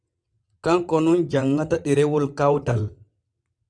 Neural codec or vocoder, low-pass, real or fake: vocoder, 22.05 kHz, 80 mel bands, WaveNeXt; 9.9 kHz; fake